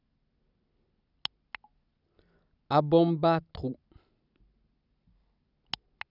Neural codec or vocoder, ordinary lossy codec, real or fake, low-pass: none; none; real; 5.4 kHz